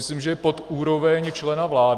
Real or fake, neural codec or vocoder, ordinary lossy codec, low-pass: real; none; Opus, 24 kbps; 14.4 kHz